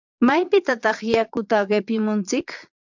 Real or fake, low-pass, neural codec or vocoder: fake; 7.2 kHz; vocoder, 22.05 kHz, 80 mel bands, Vocos